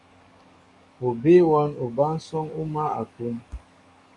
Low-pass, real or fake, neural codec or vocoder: 10.8 kHz; fake; codec, 44.1 kHz, 7.8 kbps, DAC